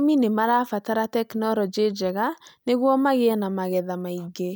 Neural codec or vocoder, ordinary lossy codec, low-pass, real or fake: none; none; none; real